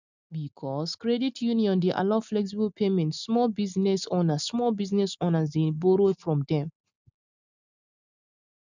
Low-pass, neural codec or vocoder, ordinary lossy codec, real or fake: 7.2 kHz; none; none; real